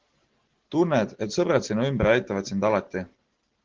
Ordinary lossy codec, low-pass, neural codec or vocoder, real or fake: Opus, 16 kbps; 7.2 kHz; none; real